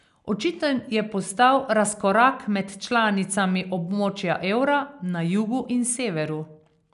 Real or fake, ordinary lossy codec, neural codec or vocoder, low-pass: real; none; none; 10.8 kHz